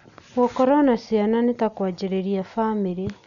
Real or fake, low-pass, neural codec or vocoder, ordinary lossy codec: real; 7.2 kHz; none; none